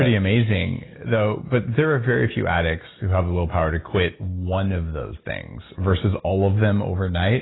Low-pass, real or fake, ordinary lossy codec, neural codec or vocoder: 7.2 kHz; real; AAC, 16 kbps; none